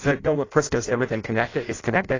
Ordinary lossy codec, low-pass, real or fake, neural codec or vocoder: AAC, 32 kbps; 7.2 kHz; fake; codec, 16 kHz in and 24 kHz out, 0.6 kbps, FireRedTTS-2 codec